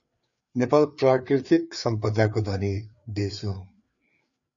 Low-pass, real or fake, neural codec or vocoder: 7.2 kHz; fake; codec, 16 kHz, 4 kbps, FreqCodec, larger model